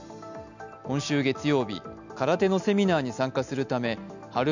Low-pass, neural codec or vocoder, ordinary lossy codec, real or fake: 7.2 kHz; none; none; real